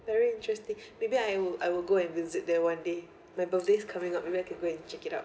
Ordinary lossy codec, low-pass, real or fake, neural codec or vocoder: none; none; real; none